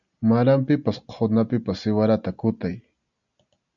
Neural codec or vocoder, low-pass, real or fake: none; 7.2 kHz; real